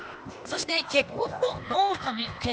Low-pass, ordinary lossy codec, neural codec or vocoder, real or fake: none; none; codec, 16 kHz, 0.8 kbps, ZipCodec; fake